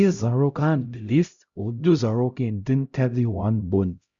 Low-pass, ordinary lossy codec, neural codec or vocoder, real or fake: 7.2 kHz; Opus, 64 kbps; codec, 16 kHz, 0.5 kbps, X-Codec, HuBERT features, trained on LibriSpeech; fake